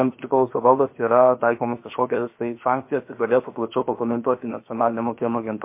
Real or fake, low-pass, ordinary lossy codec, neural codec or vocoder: fake; 3.6 kHz; MP3, 24 kbps; codec, 16 kHz, 0.7 kbps, FocalCodec